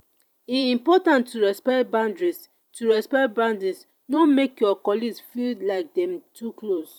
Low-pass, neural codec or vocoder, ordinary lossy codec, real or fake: 19.8 kHz; vocoder, 44.1 kHz, 128 mel bands every 512 samples, BigVGAN v2; none; fake